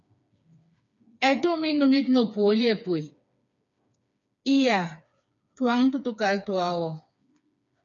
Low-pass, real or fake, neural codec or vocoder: 7.2 kHz; fake; codec, 16 kHz, 4 kbps, FreqCodec, smaller model